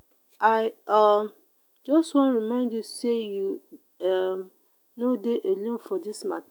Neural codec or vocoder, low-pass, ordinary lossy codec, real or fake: autoencoder, 48 kHz, 128 numbers a frame, DAC-VAE, trained on Japanese speech; none; none; fake